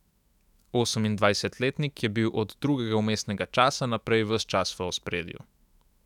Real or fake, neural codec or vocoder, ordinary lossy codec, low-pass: fake; autoencoder, 48 kHz, 128 numbers a frame, DAC-VAE, trained on Japanese speech; none; 19.8 kHz